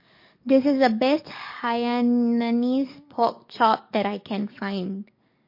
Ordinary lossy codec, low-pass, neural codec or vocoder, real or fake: MP3, 32 kbps; 5.4 kHz; codec, 44.1 kHz, 7.8 kbps, DAC; fake